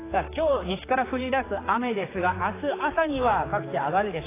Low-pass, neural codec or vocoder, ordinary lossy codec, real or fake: 3.6 kHz; codec, 16 kHz, 4 kbps, X-Codec, HuBERT features, trained on general audio; AAC, 16 kbps; fake